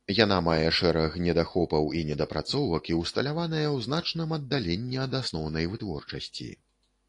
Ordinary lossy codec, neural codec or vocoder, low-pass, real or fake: AAC, 48 kbps; none; 10.8 kHz; real